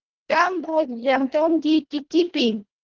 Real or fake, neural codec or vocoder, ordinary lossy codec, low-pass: fake; codec, 24 kHz, 1.5 kbps, HILCodec; Opus, 16 kbps; 7.2 kHz